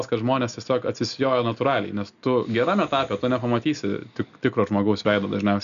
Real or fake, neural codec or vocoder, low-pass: real; none; 7.2 kHz